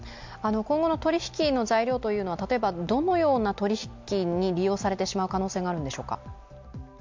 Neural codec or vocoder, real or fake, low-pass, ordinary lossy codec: none; real; 7.2 kHz; MP3, 64 kbps